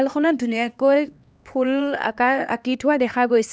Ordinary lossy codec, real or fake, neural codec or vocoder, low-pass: none; fake; codec, 16 kHz, 2 kbps, X-Codec, HuBERT features, trained on LibriSpeech; none